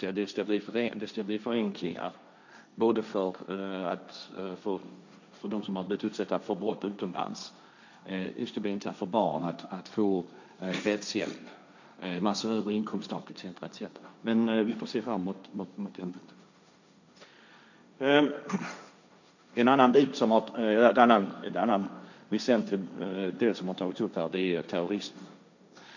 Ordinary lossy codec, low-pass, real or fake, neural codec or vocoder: none; 7.2 kHz; fake; codec, 16 kHz, 1.1 kbps, Voila-Tokenizer